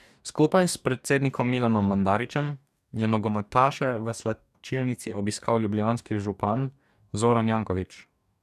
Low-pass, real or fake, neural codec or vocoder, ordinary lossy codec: 14.4 kHz; fake; codec, 44.1 kHz, 2.6 kbps, DAC; none